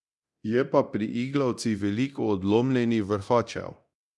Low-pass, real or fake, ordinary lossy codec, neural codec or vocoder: none; fake; none; codec, 24 kHz, 0.9 kbps, DualCodec